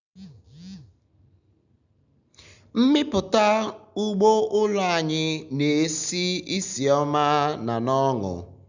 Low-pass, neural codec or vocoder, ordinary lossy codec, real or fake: 7.2 kHz; none; none; real